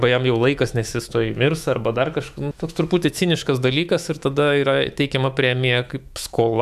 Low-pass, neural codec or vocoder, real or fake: 14.4 kHz; autoencoder, 48 kHz, 128 numbers a frame, DAC-VAE, trained on Japanese speech; fake